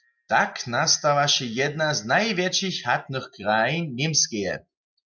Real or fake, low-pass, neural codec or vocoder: real; 7.2 kHz; none